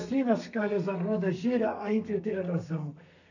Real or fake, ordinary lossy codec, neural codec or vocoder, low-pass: fake; none; codec, 32 kHz, 1.9 kbps, SNAC; 7.2 kHz